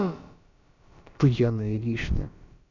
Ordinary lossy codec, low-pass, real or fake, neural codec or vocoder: none; 7.2 kHz; fake; codec, 16 kHz, about 1 kbps, DyCAST, with the encoder's durations